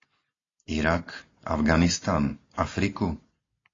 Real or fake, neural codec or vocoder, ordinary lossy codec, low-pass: real; none; AAC, 32 kbps; 7.2 kHz